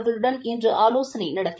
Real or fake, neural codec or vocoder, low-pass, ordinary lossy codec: fake; codec, 16 kHz, 8 kbps, FreqCodec, larger model; none; none